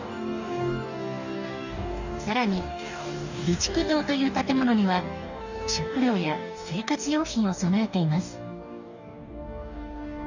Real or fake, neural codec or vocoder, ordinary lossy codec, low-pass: fake; codec, 44.1 kHz, 2.6 kbps, DAC; none; 7.2 kHz